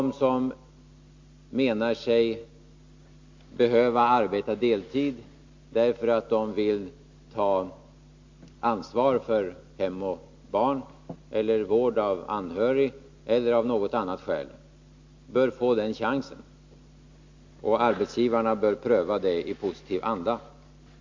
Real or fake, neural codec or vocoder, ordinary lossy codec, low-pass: real; none; MP3, 48 kbps; 7.2 kHz